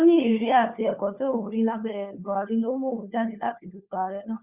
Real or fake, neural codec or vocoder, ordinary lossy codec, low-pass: fake; codec, 16 kHz, 4 kbps, FunCodec, trained on LibriTTS, 50 frames a second; Opus, 64 kbps; 3.6 kHz